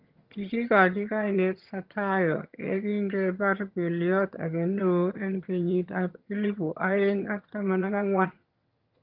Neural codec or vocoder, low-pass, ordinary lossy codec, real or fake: vocoder, 22.05 kHz, 80 mel bands, HiFi-GAN; 5.4 kHz; Opus, 24 kbps; fake